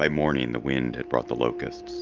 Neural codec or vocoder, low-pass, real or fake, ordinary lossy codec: none; 7.2 kHz; real; Opus, 24 kbps